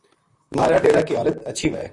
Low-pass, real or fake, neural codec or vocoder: 10.8 kHz; fake; vocoder, 44.1 kHz, 128 mel bands, Pupu-Vocoder